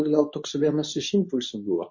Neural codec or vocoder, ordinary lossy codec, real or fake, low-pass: codec, 24 kHz, 0.9 kbps, WavTokenizer, medium speech release version 2; MP3, 32 kbps; fake; 7.2 kHz